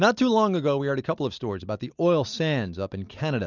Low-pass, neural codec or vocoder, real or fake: 7.2 kHz; none; real